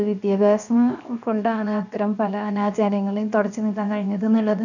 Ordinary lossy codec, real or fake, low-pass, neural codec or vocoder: none; fake; 7.2 kHz; codec, 16 kHz, 0.7 kbps, FocalCodec